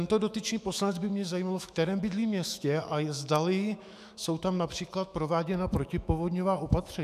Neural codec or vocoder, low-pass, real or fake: autoencoder, 48 kHz, 128 numbers a frame, DAC-VAE, trained on Japanese speech; 14.4 kHz; fake